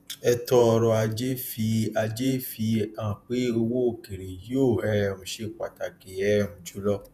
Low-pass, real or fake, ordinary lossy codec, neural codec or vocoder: 14.4 kHz; fake; none; vocoder, 48 kHz, 128 mel bands, Vocos